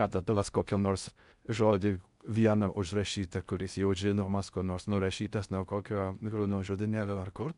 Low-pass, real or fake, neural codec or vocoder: 10.8 kHz; fake; codec, 16 kHz in and 24 kHz out, 0.6 kbps, FocalCodec, streaming, 4096 codes